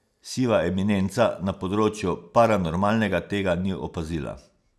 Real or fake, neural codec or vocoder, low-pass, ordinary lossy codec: real; none; none; none